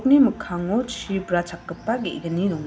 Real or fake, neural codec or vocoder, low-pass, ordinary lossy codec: real; none; none; none